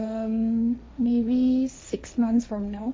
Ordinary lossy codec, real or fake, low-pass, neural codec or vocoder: none; fake; none; codec, 16 kHz, 1.1 kbps, Voila-Tokenizer